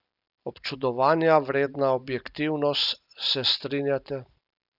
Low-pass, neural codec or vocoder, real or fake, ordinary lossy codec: 5.4 kHz; none; real; none